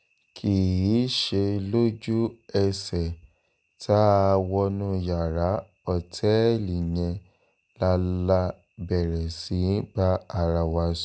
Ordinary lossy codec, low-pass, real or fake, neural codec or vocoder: none; none; real; none